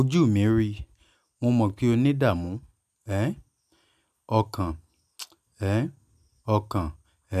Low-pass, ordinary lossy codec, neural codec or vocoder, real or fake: 14.4 kHz; none; none; real